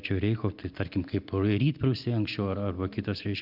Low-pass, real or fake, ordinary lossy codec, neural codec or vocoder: 5.4 kHz; real; Opus, 64 kbps; none